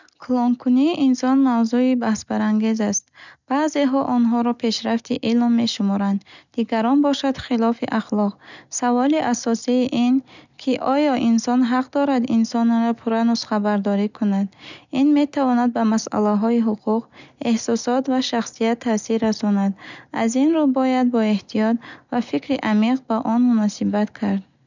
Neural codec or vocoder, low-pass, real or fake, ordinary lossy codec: none; 7.2 kHz; real; none